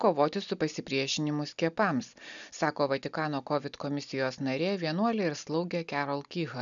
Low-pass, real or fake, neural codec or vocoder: 7.2 kHz; real; none